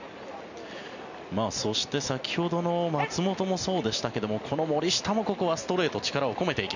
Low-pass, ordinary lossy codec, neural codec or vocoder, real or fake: 7.2 kHz; none; none; real